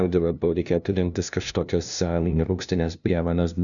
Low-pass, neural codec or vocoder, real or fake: 7.2 kHz; codec, 16 kHz, 0.5 kbps, FunCodec, trained on LibriTTS, 25 frames a second; fake